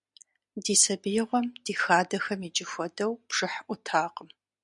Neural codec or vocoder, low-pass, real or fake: none; 10.8 kHz; real